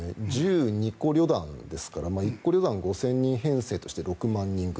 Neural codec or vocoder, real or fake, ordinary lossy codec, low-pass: none; real; none; none